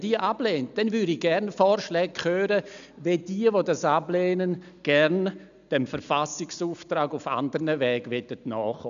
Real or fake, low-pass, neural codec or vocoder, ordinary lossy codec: real; 7.2 kHz; none; none